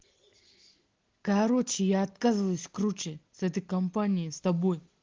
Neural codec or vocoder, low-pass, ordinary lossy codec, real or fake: none; 7.2 kHz; Opus, 16 kbps; real